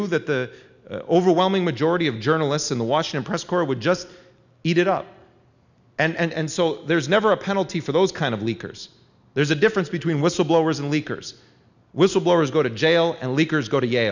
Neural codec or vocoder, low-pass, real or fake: none; 7.2 kHz; real